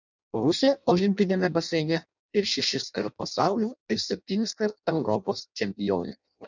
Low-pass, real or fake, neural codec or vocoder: 7.2 kHz; fake; codec, 16 kHz in and 24 kHz out, 0.6 kbps, FireRedTTS-2 codec